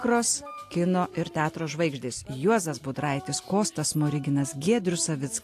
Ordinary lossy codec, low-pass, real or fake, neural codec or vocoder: AAC, 64 kbps; 14.4 kHz; real; none